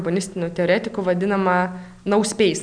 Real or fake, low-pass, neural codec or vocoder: real; 9.9 kHz; none